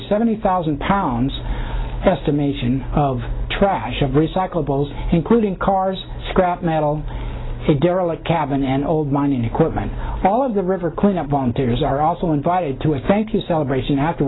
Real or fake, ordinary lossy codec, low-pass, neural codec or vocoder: real; AAC, 16 kbps; 7.2 kHz; none